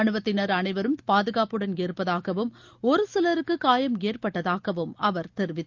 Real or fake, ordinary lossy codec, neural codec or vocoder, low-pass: real; Opus, 32 kbps; none; 7.2 kHz